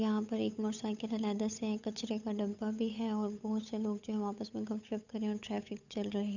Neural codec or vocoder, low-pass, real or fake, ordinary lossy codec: codec, 16 kHz, 8 kbps, FunCodec, trained on Chinese and English, 25 frames a second; 7.2 kHz; fake; none